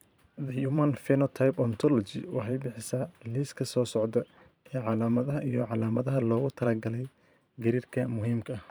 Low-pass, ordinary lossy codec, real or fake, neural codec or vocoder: none; none; fake; vocoder, 44.1 kHz, 128 mel bands every 512 samples, BigVGAN v2